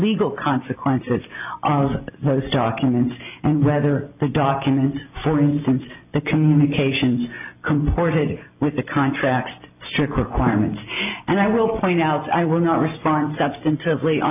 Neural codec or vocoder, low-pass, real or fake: none; 3.6 kHz; real